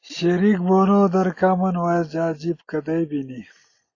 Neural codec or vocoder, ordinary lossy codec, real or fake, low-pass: none; AAC, 32 kbps; real; 7.2 kHz